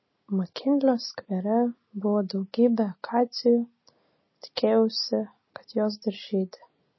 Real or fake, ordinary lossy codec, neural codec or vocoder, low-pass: real; MP3, 24 kbps; none; 7.2 kHz